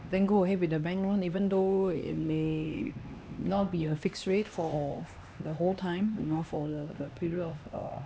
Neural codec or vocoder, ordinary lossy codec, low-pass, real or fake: codec, 16 kHz, 2 kbps, X-Codec, HuBERT features, trained on LibriSpeech; none; none; fake